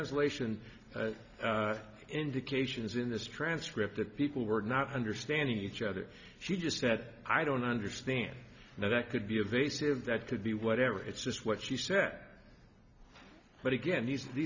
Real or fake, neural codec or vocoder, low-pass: real; none; 7.2 kHz